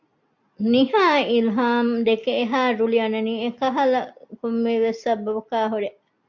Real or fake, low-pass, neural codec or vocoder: real; 7.2 kHz; none